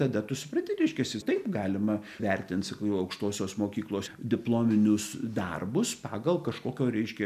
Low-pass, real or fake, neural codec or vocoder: 14.4 kHz; real; none